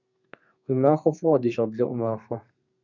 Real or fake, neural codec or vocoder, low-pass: fake; codec, 32 kHz, 1.9 kbps, SNAC; 7.2 kHz